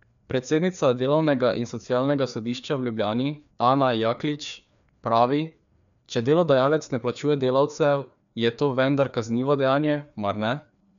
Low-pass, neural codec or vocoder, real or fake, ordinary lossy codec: 7.2 kHz; codec, 16 kHz, 2 kbps, FreqCodec, larger model; fake; none